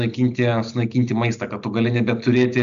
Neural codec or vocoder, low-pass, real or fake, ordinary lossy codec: none; 7.2 kHz; real; AAC, 96 kbps